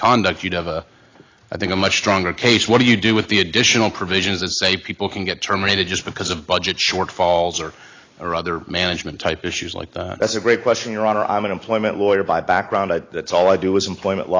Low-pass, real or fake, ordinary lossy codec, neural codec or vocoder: 7.2 kHz; real; AAC, 32 kbps; none